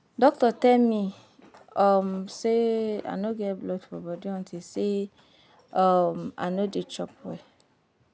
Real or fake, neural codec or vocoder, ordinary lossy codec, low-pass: real; none; none; none